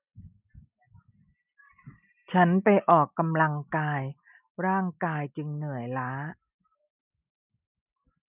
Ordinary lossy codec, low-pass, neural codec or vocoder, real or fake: none; 3.6 kHz; none; real